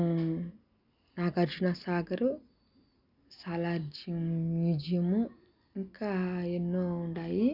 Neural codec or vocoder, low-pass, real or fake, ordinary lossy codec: none; 5.4 kHz; real; none